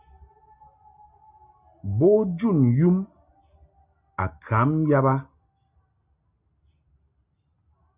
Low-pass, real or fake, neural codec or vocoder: 3.6 kHz; real; none